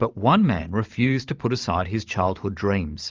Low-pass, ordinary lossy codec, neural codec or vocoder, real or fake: 7.2 kHz; Opus, 32 kbps; vocoder, 44.1 kHz, 128 mel bands every 512 samples, BigVGAN v2; fake